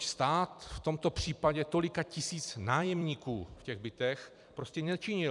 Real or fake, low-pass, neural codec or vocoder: fake; 10.8 kHz; vocoder, 44.1 kHz, 128 mel bands every 256 samples, BigVGAN v2